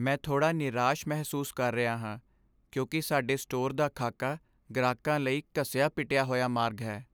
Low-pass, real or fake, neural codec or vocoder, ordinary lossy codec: none; real; none; none